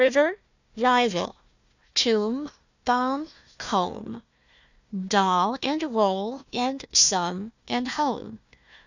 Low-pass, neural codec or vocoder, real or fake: 7.2 kHz; codec, 16 kHz, 1 kbps, FunCodec, trained on Chinese and English, 50 frames a second; fake